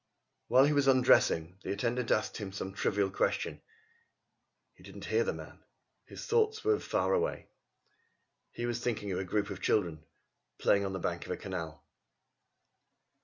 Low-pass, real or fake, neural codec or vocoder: 7.2 kHz; real; none